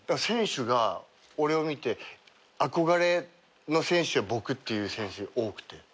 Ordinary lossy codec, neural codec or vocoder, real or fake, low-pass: none; none; real; none